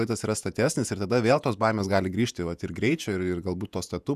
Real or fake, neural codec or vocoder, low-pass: fake; vocoder, 48 kHz, 128 mel bands, Vocos; 14.4 kHz